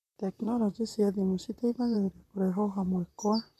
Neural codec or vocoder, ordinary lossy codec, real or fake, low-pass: vocoder, 44.1 kHz, 128 mel bands, Pupu-Vocoder; none; fake; 14.4 kHz